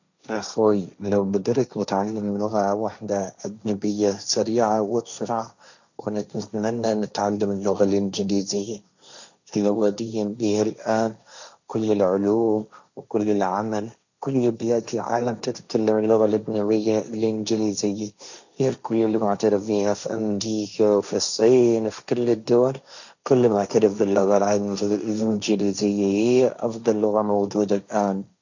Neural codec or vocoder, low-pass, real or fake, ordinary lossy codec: codec, 16 kHz, 1.1 kbps, Voila-Tokenizer; 7.2 kHz; fake; none